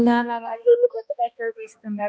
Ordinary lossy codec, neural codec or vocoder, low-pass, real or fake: none; codec, 16 kHz, 1 kbps, X-Codec, HuBERT features, trained on balanced general audio; none; fake